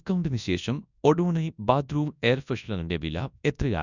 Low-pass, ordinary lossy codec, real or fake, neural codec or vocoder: 7.2 kHz; none; fake; codec, 24 kHz, 0.9 kbps, WavTokenizer, large speech release